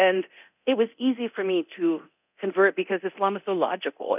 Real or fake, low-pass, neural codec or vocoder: fake; 3.6 kHz; codec, 24 kHz, 0.9 kbps, DualCodec